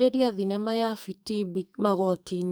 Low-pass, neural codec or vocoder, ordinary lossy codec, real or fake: none; codec, 44.1 kHz, 2.6 kbps, SNAC; none; fake